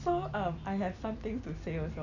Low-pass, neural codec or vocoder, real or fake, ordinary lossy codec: 7.2 kHz; none; real; none